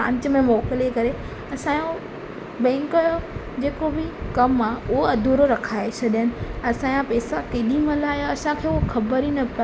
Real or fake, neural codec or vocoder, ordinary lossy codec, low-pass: real; none; none; none